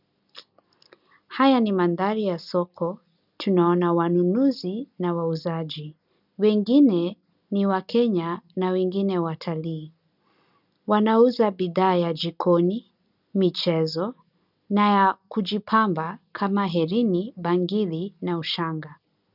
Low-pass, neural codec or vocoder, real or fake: 5.4 kHz; none; real